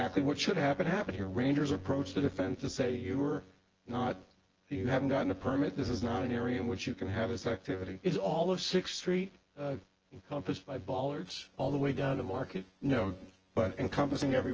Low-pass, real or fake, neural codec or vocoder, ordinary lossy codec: 7.2 kHz; fake; vocoder, 24 kHz, 100 mel bands, Vocos; Opus, 16 kbps